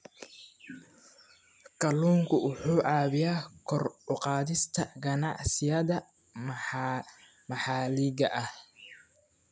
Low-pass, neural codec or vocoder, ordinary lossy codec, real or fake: none; none; none; real